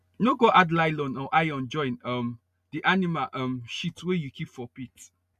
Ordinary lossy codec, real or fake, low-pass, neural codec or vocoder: none; real; 14.4 kHz; none